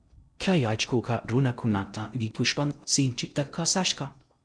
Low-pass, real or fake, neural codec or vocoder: 9.9 kHz; fake; codec, 16 kHz in and 24 kHz out, 0.6 kbps, FocalCodec, streaming, 2048 codes